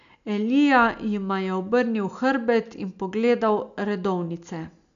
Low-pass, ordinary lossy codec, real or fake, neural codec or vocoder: 7.2 kHz; none; real; none